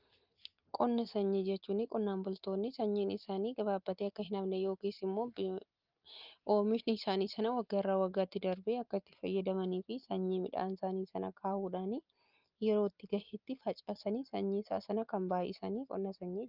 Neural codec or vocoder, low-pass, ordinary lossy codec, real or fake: none; 5.4 kHz; Opus, 24 kbps; real